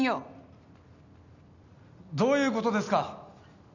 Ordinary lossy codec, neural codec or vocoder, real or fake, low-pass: none; none; real; 7.2 kHz